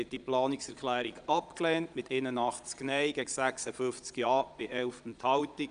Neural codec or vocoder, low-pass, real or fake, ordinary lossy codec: vocoder, 22.05 kHz, 80 mel bands, Vocos; 9.9 kHz; fake; none